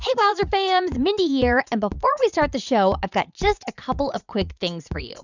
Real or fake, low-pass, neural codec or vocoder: fake; 7.2 kHz; vocoder, 44.1 kHz, 80 mel bands, Vocos